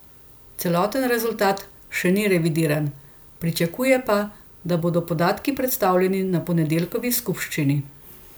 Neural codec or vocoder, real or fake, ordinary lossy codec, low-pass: none; real; none; none